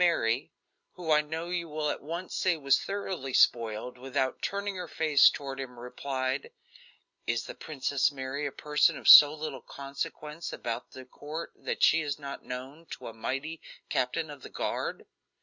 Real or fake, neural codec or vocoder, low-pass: real; none; 7.2 kHz